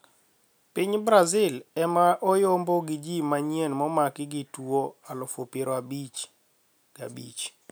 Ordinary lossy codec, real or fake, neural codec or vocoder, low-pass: none; real; none; none